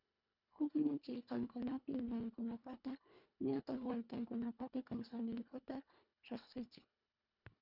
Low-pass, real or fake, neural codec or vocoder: 5.4 kHz; fake; codec, 24 kHz, 1.5 kbps, HILCodec